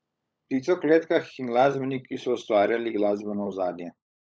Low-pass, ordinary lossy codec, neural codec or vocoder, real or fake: none; none; codec, 16 kHz, 16 kbps, FunCodec, trained on LibriTTS, 50 frames a second; fake